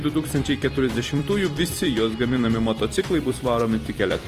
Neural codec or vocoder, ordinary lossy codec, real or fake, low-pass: vocoder, 44.1 kHz, 128 mel bands every 256 samples, BigVGAN v2; Opus, 24 kbps; fake; 14.4 kHz